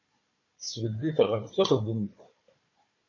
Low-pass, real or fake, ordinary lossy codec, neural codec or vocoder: 7.2 kHz; fake; MP3, 32 kbps; codec, 16 kHz, 4 kbps, FunCodec, trained on Chinese and English, 50 frames a second